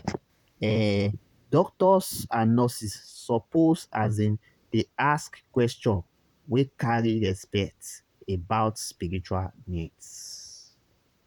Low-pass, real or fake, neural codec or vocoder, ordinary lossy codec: 19.8 kHz; fake; vocoder, 44.1 kHz, 128 mel bands, Pupu-Vocoder; none